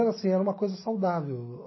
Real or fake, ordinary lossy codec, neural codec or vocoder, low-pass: real; MP3, 24 kbps; none; 7.2 kHz